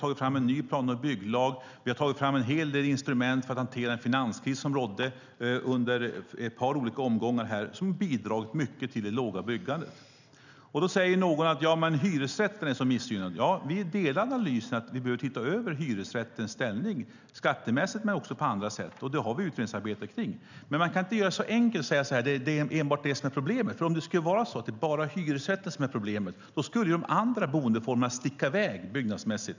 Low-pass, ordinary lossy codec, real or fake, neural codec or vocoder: 7.2 kHz; none; real; none